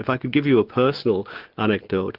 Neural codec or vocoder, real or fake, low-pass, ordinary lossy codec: none; real; 5.4 kHz; Opus, 32 kbps